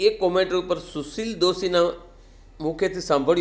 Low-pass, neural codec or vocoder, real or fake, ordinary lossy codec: none; none; real; none